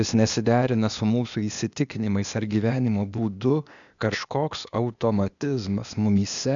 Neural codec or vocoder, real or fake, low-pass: codec, 16 kHz, 0.8 kbps, ZipCodec; fake; 7.2 kHz